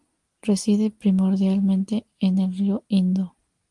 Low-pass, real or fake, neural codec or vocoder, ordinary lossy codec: 10.8 kHz; real; none; Opus, 24 kbps